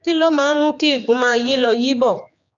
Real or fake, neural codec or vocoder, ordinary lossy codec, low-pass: fake; codec, 16 kHz, 2 kbps, X-Codec, HuBERT features, trained on general audio; none; 7.2 kHz